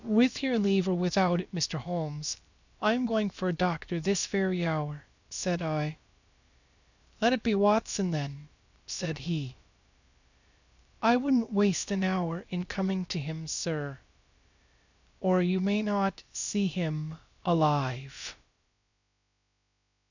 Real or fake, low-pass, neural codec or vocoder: fake; 7.2 kHz; codec, 16 kHz, about 1 kbps, DyCAST, with the encoder's durations